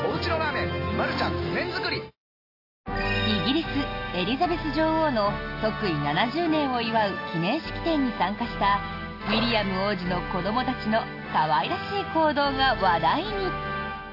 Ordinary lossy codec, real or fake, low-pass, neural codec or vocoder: AAC, 32 kbps; real; 5.4 kHz; none